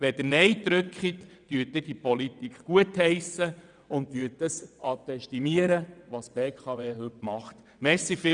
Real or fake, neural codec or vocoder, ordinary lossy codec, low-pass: fake; vocoder, 22.05 kHz, 80 mel bands, WaveNeXt; none; 9.9 kHz